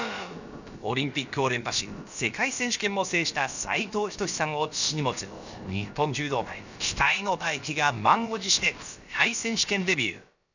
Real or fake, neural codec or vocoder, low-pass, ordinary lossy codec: fake; codec, 16 kHz, about 1 kbps, DyCAST, with the encoder's durations; 7.2 kHz; none